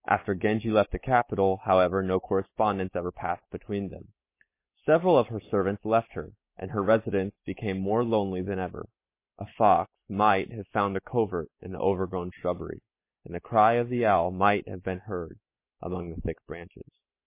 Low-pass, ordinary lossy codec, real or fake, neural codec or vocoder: 3.6 kHz; MP3, 24 kbps; real; none